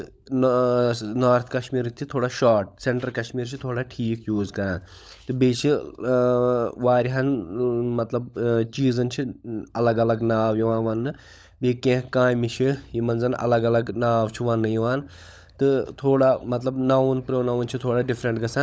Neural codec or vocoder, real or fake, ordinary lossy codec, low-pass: codec, 16 kHz, 16 kbps, FunCodec, trained on LibriTTS, 50 frames a second; fake; none; none